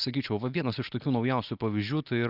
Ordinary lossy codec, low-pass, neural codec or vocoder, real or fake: Opus, 24 kbps; 5.4 kHz; none; real